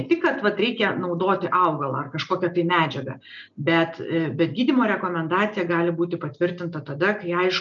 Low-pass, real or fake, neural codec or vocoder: 7.2 kHz; real; none